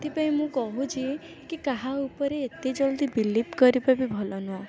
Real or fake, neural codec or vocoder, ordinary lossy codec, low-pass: real; none; none; none